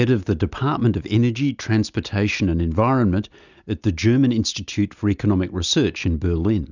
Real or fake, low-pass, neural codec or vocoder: real; 7.2 kHz; none